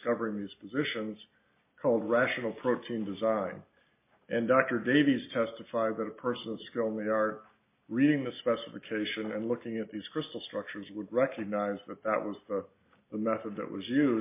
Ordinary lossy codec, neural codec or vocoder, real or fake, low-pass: MP3, 24 kbps; none; real; 3.6 kHz